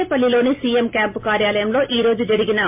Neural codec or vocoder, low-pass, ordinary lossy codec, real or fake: none; 3.6 kHz; MP3, 32 kbps; real